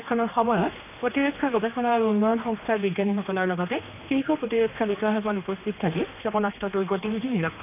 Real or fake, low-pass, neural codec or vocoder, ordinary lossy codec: fake; 3.6 kHz; codec, 16 kHz, 2 kbps, X-Codec, HuBERT features, trained on general audio; none